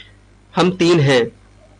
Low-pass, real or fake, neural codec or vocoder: 9.9 kHz; real; none